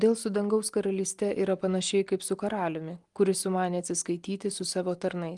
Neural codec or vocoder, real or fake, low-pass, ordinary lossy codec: none; real; 10.8 kHz; Opus, 24 kbps